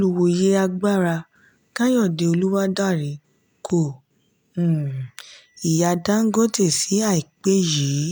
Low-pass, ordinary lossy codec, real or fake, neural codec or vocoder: none; none; real; none